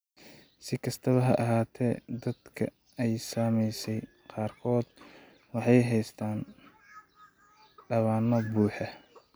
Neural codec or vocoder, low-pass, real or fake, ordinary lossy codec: none; none; real; none